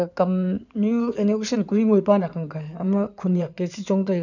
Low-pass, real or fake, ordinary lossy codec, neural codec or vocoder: 7.2 kHz; fake; none; codec, 16 kHz in and 24 kHz out, 2.2 kbps, FireRedTTS-2 codec